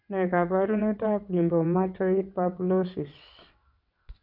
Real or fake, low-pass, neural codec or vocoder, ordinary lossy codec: fake; 5.4 kHz; vocoder, 22.05 kHz, 80 mel bands, WaveNeXt; none